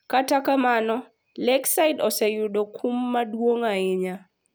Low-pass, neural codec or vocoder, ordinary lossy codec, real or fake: none; none; none; real